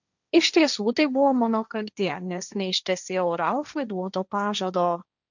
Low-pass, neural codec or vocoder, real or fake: 7.2 kHz; codec, 16 kHz, 1.1 kbps, Voila-Tokenizer; fake